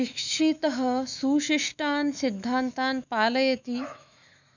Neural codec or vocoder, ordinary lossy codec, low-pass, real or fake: autoencoder, 48 kHz, 128 numbers a frame, DAC-VAE, trained on Japanese speech; none; 7.2 kHz; fake